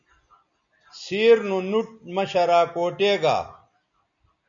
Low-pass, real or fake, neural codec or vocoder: 7.2 kHz; real; none